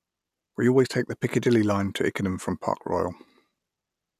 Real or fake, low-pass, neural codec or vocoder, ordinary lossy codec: real; 14.4 kHz; none; none